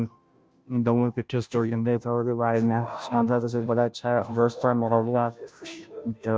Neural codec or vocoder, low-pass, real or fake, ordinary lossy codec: codec, 16 kHz, 0.5 kbps, FunCodec, trained on Chinese and English, 25 frames a second; none; fake; none